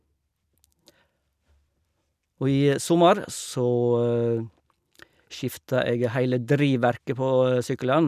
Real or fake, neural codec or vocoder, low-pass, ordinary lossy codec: real; none; 14.4 kHz; none